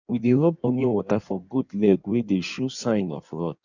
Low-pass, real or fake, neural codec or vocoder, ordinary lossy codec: 7.2 kHz; fake; codec, 16 kHz in and 24 kHz out, 1.1 kbps, FireRedTTS-2 codec; none